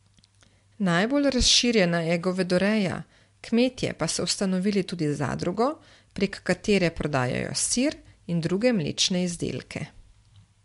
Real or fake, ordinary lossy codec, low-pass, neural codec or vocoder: real; MP3, 64 kbps; 10.8 kHz; none